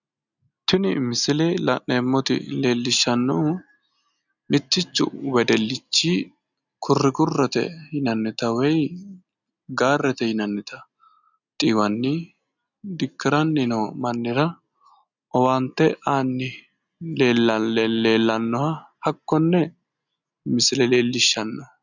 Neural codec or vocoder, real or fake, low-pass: none; real; 7.2 kHz